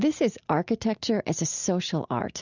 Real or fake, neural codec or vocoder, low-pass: real; none; 7.2 kHz